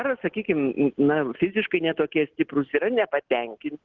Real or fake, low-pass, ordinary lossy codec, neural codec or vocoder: real; 7.2 kHz; Opus, 16 kbps; none